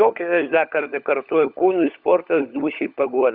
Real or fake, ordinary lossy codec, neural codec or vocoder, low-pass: fake; Opus, 64 kbps; codec, 16 kHz, 16 kbps, FunCodec, trained on LibriTTS, 50 frames a second; 5.4 kHz